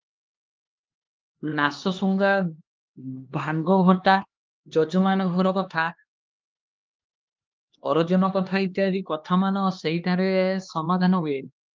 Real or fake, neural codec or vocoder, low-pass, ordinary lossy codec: fake; codec, 16 kHz, 2 kbps, X-Codec, HuBERT features, trained on LibriSpeech; 7.2 kHz; Opus, 32 kbps